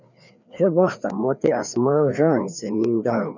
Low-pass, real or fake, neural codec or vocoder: 7.2 kHz; fake; codec, 16 kHz, 2 kbps, FreqCodec, larger model